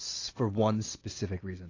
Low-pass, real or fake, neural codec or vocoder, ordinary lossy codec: 7.2 kHz; real; none; AAC, 32 kbps